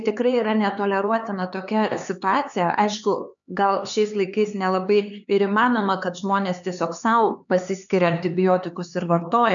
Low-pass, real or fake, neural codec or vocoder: 7.2 kHz; fake; codec, 16 kHz, 4 kbps, X-Codec, HuBERT features, trained on LibriSpeech